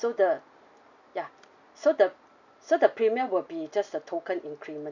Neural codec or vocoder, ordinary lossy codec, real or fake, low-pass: none; none; real; 7.2 kHz